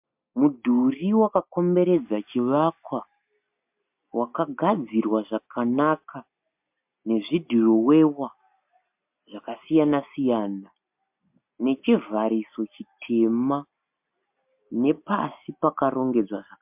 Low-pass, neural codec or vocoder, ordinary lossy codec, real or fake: 3.6 kHz; none; MP3, 32 kbps; real